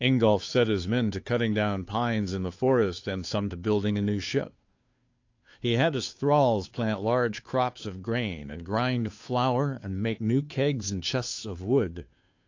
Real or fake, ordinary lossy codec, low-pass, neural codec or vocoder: fake; AAC, 48 kbps; 7.2 kHz; codec, 16 kHz, 2 kbps, FunCodec, trained on Chinese and English, 25 frames a second